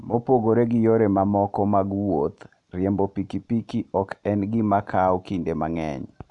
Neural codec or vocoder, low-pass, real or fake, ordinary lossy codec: none; 10.8 kHz; real; none